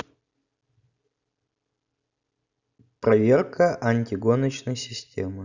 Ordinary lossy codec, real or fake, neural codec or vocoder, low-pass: none; real; none; 7.2 kHz